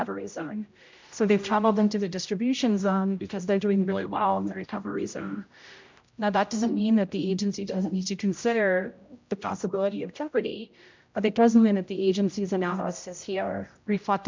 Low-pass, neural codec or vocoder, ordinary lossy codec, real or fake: 7.2 kHz; codec, 16 kHz, 0.5 kbps, X-Codec, HuBERT features, trained on general audio; MP3, 64 kbps; fake